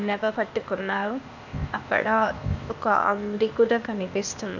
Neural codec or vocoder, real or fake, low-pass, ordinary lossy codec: codec, 16 kHz, 0.8 kbps, ZipCodec; fake; 7.2 kHz; none